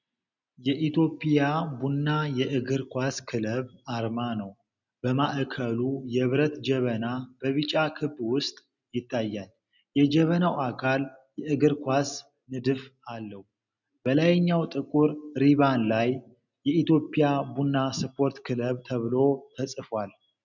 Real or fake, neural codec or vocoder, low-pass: real; none; 7.2 kHz